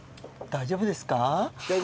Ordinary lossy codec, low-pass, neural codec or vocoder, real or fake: none; none; none; real